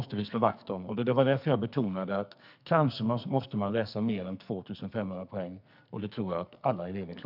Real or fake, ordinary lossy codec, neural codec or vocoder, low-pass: fake; none; codec, 44.1 kHz, 2.6 kbps, SNAC; 5.4 kHz